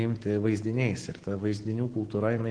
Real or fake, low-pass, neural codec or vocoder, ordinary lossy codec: fake; 9.9 kHz; codec, 44.1 kHz, 7.8 kbps, Pupu-Codec; Opus, 16 kbps